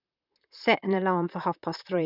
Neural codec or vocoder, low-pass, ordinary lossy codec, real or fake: vocoder, 44.1 kHz, 128 mel bands, Pupu-Vocoder; 5.4 kHz; none; fake